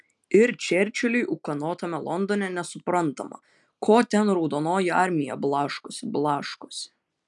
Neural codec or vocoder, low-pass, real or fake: none; 10.8 kHz; real